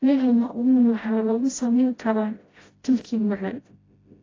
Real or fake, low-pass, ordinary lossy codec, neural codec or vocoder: fake; 7.2 kHz; AAC, 32 kbps; codec, 16 kHz, 0.5 kbps, FreqCodec, smaller model